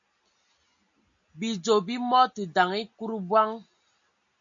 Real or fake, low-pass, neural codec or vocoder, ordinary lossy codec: real; 7.2 kHz; none; MP3, 64 kbps